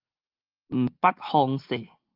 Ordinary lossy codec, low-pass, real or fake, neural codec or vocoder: Opus, 32 kbps; 5.4 kHz; real; none